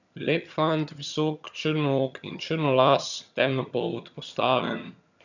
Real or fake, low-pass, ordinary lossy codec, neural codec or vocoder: fake; 7.2 kHz; none; vocoder, 22.05 kHz, 80 mel bands, HiFi-GAN